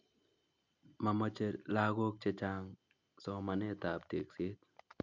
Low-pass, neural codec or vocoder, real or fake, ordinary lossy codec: 7.2 kHz; none; real; none